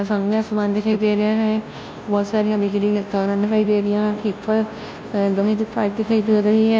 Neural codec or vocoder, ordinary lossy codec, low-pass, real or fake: codec, 16 kHz, 0.5 kbps, FunCodec, trained on Chinese and English, 25 frames a second; none; none; fake